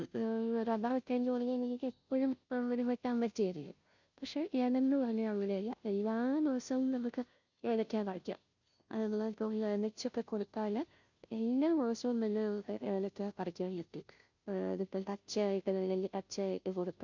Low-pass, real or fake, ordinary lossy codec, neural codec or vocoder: 7.2 kHz; fake; none; codec, 16 kHz, 0.5 kbps, FunCodec, trained on Chinese and English, 25 frames a second